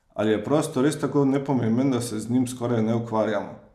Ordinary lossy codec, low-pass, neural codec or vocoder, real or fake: none; 14.4 kHz; none; real